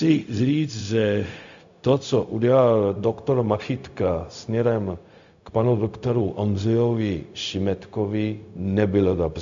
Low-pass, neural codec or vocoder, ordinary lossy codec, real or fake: 7.2 kHz; codec, 16 kHz, 0.4 kbps, LongCat-Audio-Codec; AAC, 64 kbps; fake